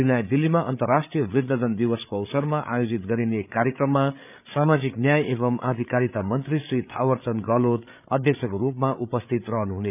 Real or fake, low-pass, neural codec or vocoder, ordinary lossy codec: fake; 3.6 kHz; codec, 16 kHz, 8 kbps, FreqCodec, larger model; none